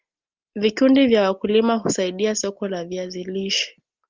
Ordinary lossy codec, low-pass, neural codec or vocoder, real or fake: Opus, 24 kbps; 7.2 kHz; none; real